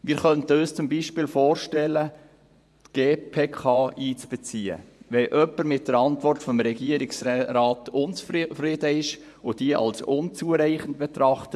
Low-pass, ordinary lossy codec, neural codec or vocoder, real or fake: none; none; vocoder, 24 kHz, 100 mel bands, Vocos; fake